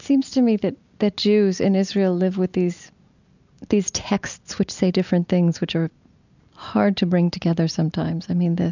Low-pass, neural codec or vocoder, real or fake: 7.2 kHz; none; real